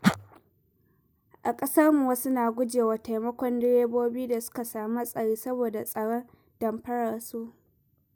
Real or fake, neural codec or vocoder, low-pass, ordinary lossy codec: real; none; none; none